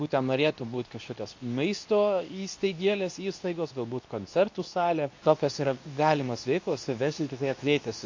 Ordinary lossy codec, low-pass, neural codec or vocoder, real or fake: AAC, 48 kbps; 7.2 kHz; codec, 24 kHz, 0.9 kbps, WavTokenizer, medium speech release version 2; fake